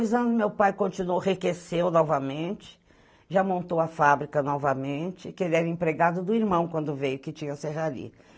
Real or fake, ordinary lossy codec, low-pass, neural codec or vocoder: real; none; none; none